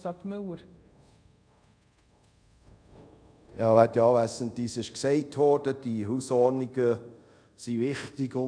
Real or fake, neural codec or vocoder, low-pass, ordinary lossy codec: fake; codec, 24 kHz, 0.5 kbps, DualCodec; 9.9 kHz; none